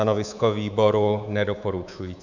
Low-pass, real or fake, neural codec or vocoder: 7.2 kHz; fake; codec, 24 kHz, 3.1 kbps, DualCodec